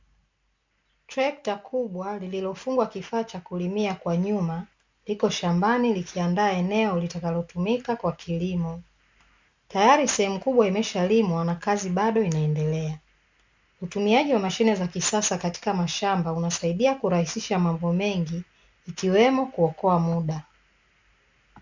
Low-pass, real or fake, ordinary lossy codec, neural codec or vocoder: 7.2 kHz; real; MP3, 64 kbps; none